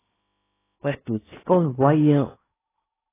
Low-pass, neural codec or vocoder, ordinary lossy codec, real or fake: 3.6 kHz; codec, 16 kHz in and 24 kHz out, 0.8 kbps, FocalCodec, streaming, 65536 codes; AAC, 16 kbps; fake